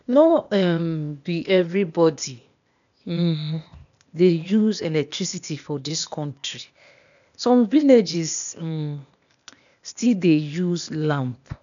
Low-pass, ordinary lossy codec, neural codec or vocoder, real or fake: 7.2 kHz; none; codec, 16 kHz, 0.8 kbps, ZipCodec; fake